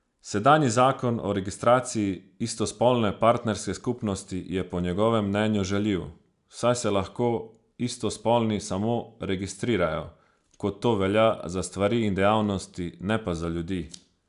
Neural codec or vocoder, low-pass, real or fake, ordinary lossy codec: none; 10.8 kHz; real; none